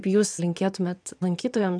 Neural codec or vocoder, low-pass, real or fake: vocoder, 22.05 kHz, 80 mel bands, Vocos; 9.9 kHz; fake